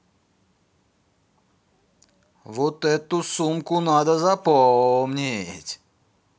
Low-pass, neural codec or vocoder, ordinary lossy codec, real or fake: none; none; none; real